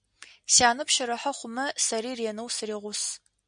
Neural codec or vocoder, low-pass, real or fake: none; 9.9 kHz; real